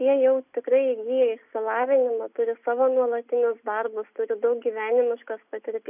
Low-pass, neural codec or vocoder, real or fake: 3.6 kHz; none; real